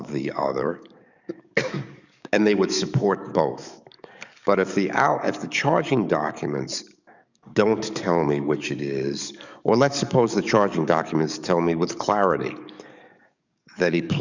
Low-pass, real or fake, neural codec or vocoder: 7.2 kHz; fake; codec, 44.1 kHz, 7.8 kbps, DAC